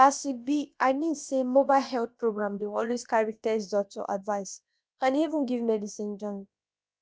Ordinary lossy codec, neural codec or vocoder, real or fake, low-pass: none; codec, 16 kHz, about 1 kbps, DyCAST, with the encoder's durations; fake; none